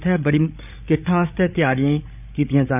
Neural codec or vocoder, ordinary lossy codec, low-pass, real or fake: codec, 16 kHz, 8 kbps, FreqCodec, larger model; none; 3.6 kHz; fake